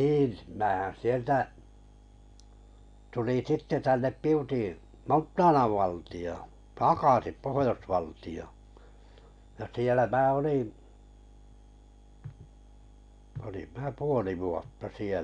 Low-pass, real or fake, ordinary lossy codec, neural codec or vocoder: 9.9 kHz; real; none; none